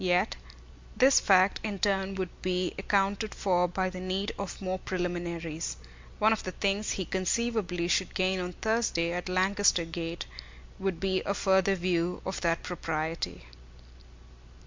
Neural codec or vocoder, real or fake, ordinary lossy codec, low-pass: none; real; MP3, 64 kbps; 7.2 kHz